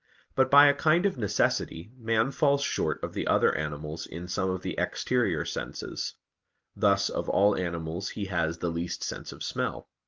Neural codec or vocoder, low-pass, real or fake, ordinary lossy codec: none; 7.2 kHz; real; Opus, 32 kbps